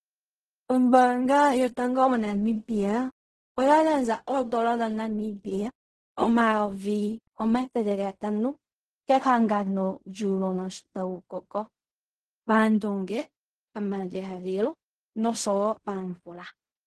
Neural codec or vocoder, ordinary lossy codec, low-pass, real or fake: codec, 16 kHz in and 24 kHz out, 0.4 kbps, LongCat-Audio-Codec, fine tuned four codebook decoder; Opus, 16 kbps; 10.8 kHz; fake